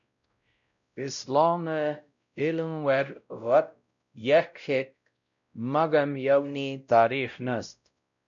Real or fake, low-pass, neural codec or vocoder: fake; 7.2 kHz; codec, 16 kHz, 0.5 kbps, X-Codec, WavLM features, trained on Multilingual LibriSpeech